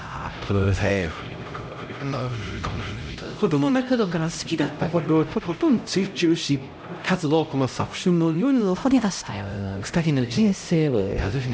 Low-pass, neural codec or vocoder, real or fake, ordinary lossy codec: none; codec, 16 kHz, 0.5 kbps, X-Codec, HuBERT features, trained on LibriSpeech; fake; none